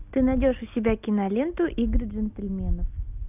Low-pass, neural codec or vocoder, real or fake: 3.6 kHz; none; real